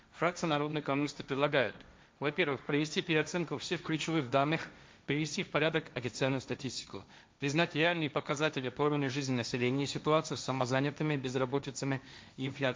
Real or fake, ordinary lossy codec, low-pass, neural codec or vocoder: fake; none; none; codec, 16 kHz, 1.1 kbps, Voila-Tokenizer